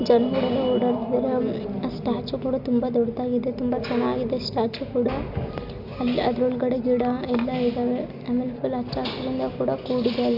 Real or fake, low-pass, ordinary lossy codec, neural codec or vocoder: real; 5.4 kHz; none; none